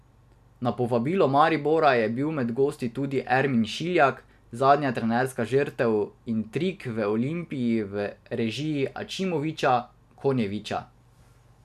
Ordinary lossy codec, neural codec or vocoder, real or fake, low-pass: none; none; real; 14.4 kHz